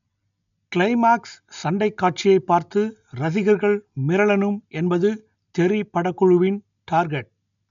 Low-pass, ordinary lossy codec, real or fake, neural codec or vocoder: 7.2 kHz; none; real; none